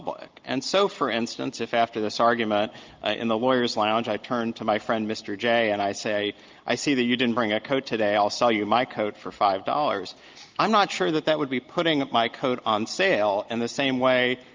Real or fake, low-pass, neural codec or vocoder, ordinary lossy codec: real; 7.2 kHz; none; Opus, 32 kbps